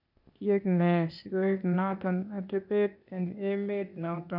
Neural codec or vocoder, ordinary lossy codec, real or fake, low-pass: codec, 16 kHz, 0.8 kbps, ZipCodec; none; fake; 5.4 kHz